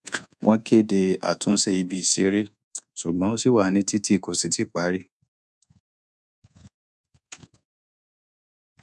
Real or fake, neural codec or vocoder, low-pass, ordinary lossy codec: fake; codec, 24 kHz, 1.2 kbps, DualCodec; none; none